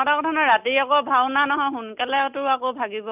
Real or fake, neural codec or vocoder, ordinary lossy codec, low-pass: real; none; none; 3.6 kHz